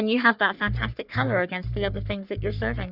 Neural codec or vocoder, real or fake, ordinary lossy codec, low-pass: codec, 44.1 kHz, 3.4 kbps, Pupu-Codec; fake; Opus, 64 kbps; 5.4 kHz